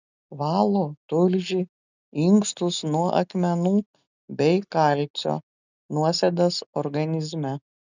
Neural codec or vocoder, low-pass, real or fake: none; 7.2 kHz; real